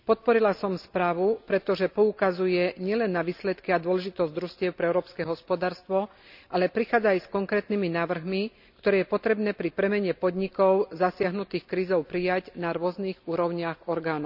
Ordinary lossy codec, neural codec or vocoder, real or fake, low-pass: none; none; real; 5.4 kHz